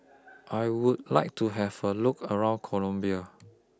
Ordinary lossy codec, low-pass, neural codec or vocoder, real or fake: none; none; none; real